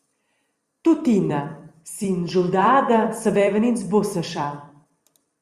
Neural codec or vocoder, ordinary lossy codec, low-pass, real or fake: vocoder, 44.1 kHz, 128 mel bands every 256 samples, BigVGAN v2; Opus, 64 kbps; 14.4 kHz; fake